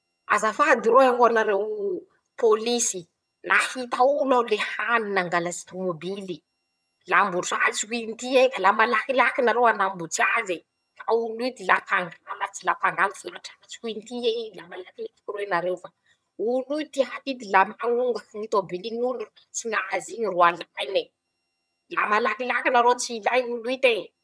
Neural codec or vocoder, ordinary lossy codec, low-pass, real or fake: vocoder, 22.05 kHz, 80 mel bands, HiFi-GAN; none; none; fake